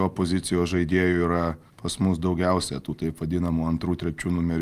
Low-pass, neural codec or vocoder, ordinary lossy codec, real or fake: 14.4 kHz; none; Opus, 32 kbps; real